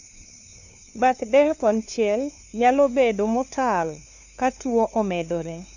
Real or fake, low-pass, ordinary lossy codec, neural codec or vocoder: fake; 7.2 kHz; none; codec, 16 kHz, 2 kbps, FunCodec, trained on LibriTTS, 25 frames a second